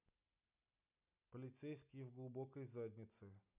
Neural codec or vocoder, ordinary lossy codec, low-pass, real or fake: none; none; 3.6 kHz; real